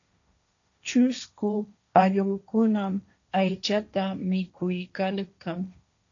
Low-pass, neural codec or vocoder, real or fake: 7.2 kHz; codec, 16 kHz, 1.1 kbps, Voila-Tokenizer; fake